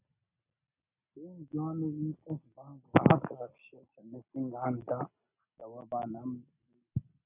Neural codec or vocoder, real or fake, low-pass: none; real; 3.6 kHz